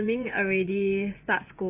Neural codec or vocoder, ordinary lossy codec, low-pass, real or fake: vocoder, 24 kHz, 100 mel bands, Vocos; none; 3.6 kHz; fake